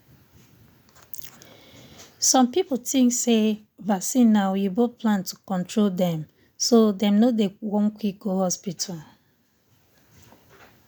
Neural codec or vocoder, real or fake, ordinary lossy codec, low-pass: none; real; none; none